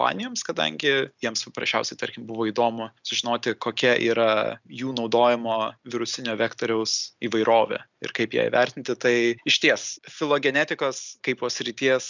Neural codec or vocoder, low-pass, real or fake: none; 7.2 kHz; real